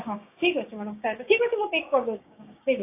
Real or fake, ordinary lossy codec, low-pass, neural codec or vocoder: fake; AAC, 24 kbps; 3.6 kHz; codec, 16 kHz, 6 kbps, DAC